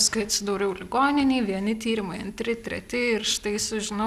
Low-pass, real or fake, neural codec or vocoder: 14.4 kHz; real; none